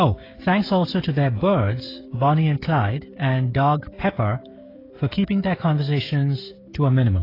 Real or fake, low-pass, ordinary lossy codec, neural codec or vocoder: fake; 5.4 kHz; AAC, 24 kbps; codec, 16 kHz, 16 kbps, FreqCodec, smaller model